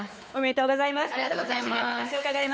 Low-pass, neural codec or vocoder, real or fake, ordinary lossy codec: none; codec, 16 kHz, 4 kbps, X-Codec, WavLM features, trained on Multilingual LibriSpeech; fake; none